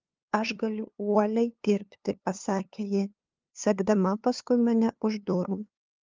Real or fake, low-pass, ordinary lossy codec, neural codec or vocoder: fake; 7.2 kHz; Opus, 24 kbps; codec, 16 kHz, 2 kbps, FunCodec, trained on LibriTTS, 25 frames a second